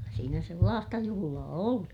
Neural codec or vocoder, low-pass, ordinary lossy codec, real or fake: none; 19.8 kHz; none; real